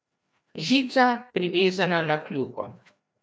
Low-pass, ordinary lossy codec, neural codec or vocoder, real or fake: none; none; codec, 16 kHz, 1 kbps, FreqCodec, larger model; fake